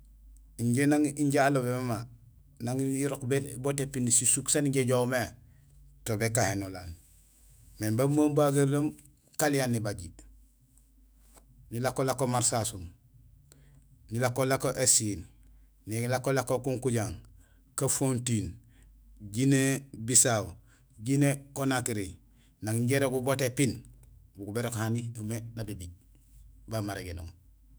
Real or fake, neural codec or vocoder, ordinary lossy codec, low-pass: fake; autoencoder, 48 kHz, 128 numbers a frame, DAC-VAE, trained on Japanese speech; none; none